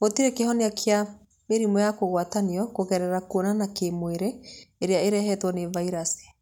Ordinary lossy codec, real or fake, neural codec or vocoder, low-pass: none; real; none; 19.8 kHz